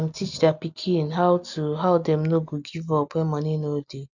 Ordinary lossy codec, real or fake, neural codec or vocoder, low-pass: none; real; none; 7.2 kHz